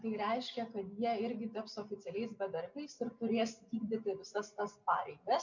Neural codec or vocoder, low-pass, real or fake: none; 7.2 kHz; real